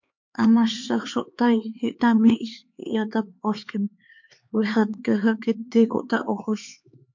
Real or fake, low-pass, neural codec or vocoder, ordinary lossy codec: fake; 7.2 kHz; codec, 16 kHz, 4 kbps, X-Codec, HuBERT features, trained on LibriSpeech; MP3, 48 kbps